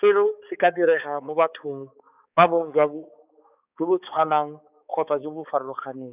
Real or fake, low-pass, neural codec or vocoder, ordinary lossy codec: fake; 3.6 kHz; codec, 16 kHz, 4 kbps, X-Codec, HuBERT features, trained on balanced general audio; none